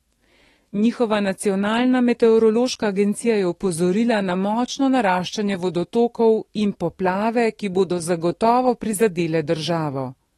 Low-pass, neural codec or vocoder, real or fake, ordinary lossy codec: 19.8 kHz; autoencoder, 48 kHz, 128 numbers a frame, DAC-VAE, trained on Japanese speech; fake; AAC, 32 kbps